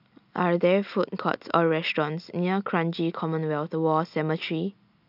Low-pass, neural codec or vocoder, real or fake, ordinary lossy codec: 5.4 kHz; none; real; none